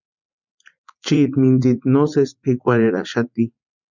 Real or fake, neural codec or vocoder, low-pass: fake; vocoder, 44.1 kHz, 80 mel bands, Vocos; 7.2 kHz